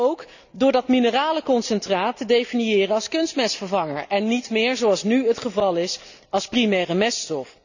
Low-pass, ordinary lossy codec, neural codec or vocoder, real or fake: 7.2 kHz; none; none; real